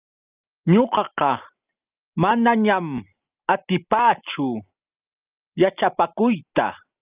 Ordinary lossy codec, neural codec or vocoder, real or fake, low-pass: Opus, 24 kbps; none; real; 3.6 kHz